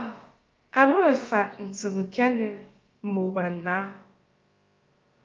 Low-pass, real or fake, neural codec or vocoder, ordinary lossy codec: 7.2 kHz; fake; codec, 16 kHz, about 1 kbps, DyCAST, with the encoder's durations; Opus, 24 kbps